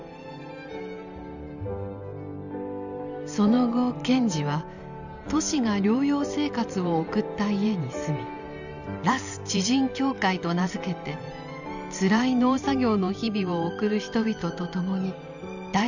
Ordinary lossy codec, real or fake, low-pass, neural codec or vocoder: none; fake; 7.2 kHz; vocoder, 44.1 kHz, 128 mel bands every 512 samples, BigVGAN v2